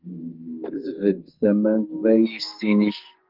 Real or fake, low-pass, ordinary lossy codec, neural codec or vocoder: fake; 5.4 kHz; Opus, 32 kbps; codec, 44.1 kHz, 2.6 kbps, SNAC